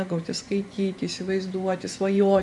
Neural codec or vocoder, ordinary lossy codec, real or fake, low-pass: vocoder, 24 kHz, 100 mel bands, Vocos; MP3, 96 kbps; fake; 10.8 kHz